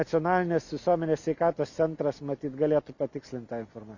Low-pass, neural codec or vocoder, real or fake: 7.2 kHz; none; real